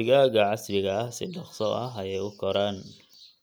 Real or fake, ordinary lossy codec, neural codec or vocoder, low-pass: real; none; none; none